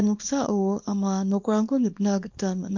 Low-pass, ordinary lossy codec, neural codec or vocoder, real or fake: 7.2 kHz; MP3, 48 kbps; codec, 24 kHz, 0.9 kbps, WavTokenizer, small release; fake